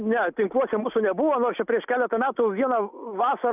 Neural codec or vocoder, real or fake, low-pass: none; real; 3.6 kHz